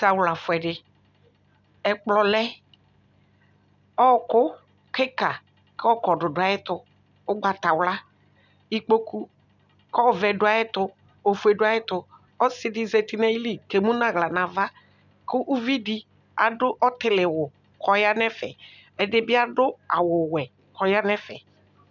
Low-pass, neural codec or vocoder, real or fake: 7.2 kHz; none; real